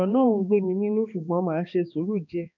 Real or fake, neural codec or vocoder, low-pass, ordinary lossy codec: fake; codec, 16 kHz, 2 kbps, X-Codec, HuBERT features, trained on balanced general audio; 7.2 kHz; none